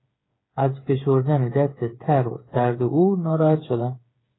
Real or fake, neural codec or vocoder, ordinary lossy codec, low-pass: fake; codec, 16 kHz, 8 kbps, FreqCodec, smaller model; AAC, 16 kbps; 7.2 kHz